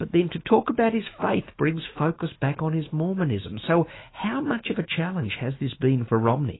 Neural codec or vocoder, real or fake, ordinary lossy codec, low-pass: autoencoder, 48 kHz, 128 numbers a frame, DAC-VAE, trained on Japanese speech; fake; AAC, 16 kbps; 7.2 kHz